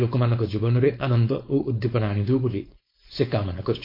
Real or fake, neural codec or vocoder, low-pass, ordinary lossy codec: fake; codec, 16 kHz, 4.8 kbps, FACodec; 5.4 kHz; MP3, 32 kbps